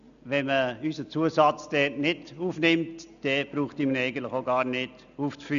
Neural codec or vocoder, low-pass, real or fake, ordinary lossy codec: none; 7.2 kHz; real; none